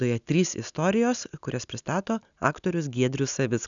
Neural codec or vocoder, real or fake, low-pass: none; real; 7.2 kHz